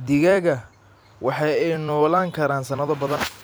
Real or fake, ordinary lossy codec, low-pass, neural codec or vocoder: fake; none; none; vocoder, 44.1 kHz, 128 mel bands every 256 samples, BigVGAN v2